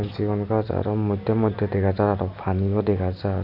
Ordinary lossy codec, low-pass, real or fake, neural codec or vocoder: none; 5.4 kHz; real; none